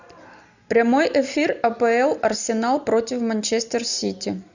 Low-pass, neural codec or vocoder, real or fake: 7.2 kHz; none; real